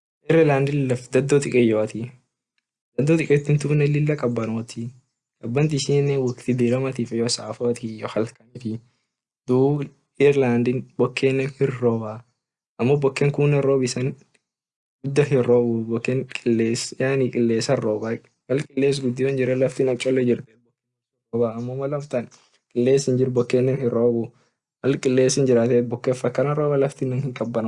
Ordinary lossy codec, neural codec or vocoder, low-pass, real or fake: Opus, 64 kbps; none; 10.8 kHz; real